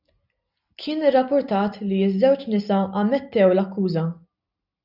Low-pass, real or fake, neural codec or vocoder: 5.4 kHz; real; none